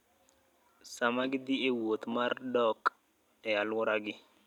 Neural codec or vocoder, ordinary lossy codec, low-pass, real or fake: vocoder, 44.1 kHz, 128 mel bands every 256 samples, BigVGAN v2; none; 19.8 kHz; fake